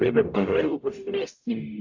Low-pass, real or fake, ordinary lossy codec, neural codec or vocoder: 7.2 kHz; fake; AAC, 48 kbps; codec, 44.1 kHz, 0.9 kbps, DAC